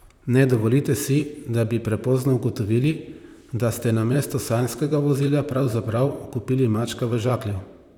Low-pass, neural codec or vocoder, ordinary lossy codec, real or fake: 19.8 kHz; vocoder, 44.1 kHz, 128 mel bands, Pupu-Vocoder; none; fake